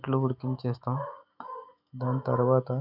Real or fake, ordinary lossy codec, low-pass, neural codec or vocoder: fake; none; 5.4 kHz; autoencoder, 48 kHz, 128 numbers a frame, DAC-VAE, trained on Japanese speech